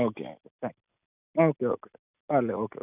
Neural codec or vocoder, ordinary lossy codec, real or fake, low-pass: none; none; real; 3.6 kHz